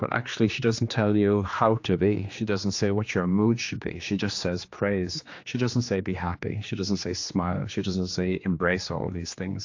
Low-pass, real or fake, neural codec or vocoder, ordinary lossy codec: 7.2 kHz; fake; codec, 16 kHz, 2 kbps, X-Codec, HuBERT features, trained on general audio; AAC, 48 kbps